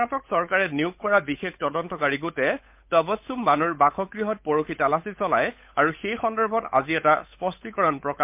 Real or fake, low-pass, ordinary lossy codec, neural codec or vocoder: fake; 3.6 kHz; MP3, 32 kbps; codec, 16 kHz, 8 kbps, FunCodec, trained on Chinese and English, 25 frames a second